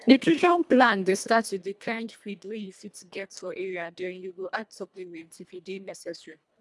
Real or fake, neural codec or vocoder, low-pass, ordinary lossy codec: fake; codec, 24 kHz, 1.5 kbps, HILCodec; none; none